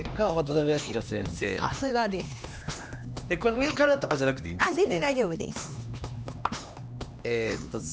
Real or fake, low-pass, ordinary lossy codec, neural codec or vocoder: fake; none; none; codec, 16 kHz, 2 kbps, X-Codec, HuBERT features, trained on LibriSpeech